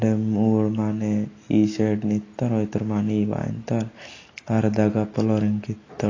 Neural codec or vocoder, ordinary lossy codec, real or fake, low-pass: none; AAC, 32 kbps; real; 7.2 kHz